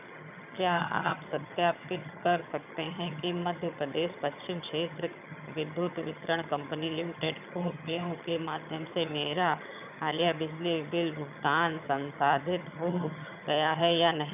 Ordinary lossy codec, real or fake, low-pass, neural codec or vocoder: none; fake; 3.6 kHz; vocoder, 22.05 kHz, 80 mel bands, HiFi-GAN